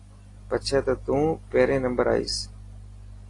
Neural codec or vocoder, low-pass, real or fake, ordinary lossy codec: none; 10.8 kHz; real; AAC, 32 kbps